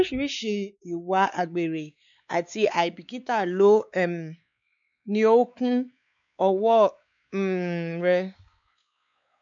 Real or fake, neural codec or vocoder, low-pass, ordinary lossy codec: fake; codec, 16 kHz, 4 kbps, X-Codec, WavLM features, trained on Multilingual LibriSpeech; 7.2 kHz; none